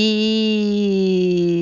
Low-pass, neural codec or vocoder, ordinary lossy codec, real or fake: 7.2 kHz; none; none; real